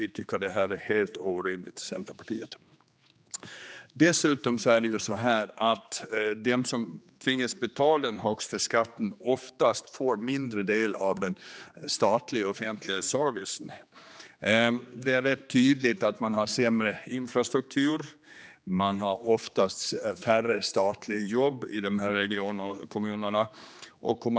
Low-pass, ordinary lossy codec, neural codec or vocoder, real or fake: none; none; codec, 16 kHz, 2 kbps, X-Codec, HuBERT features, trained on general audio; fake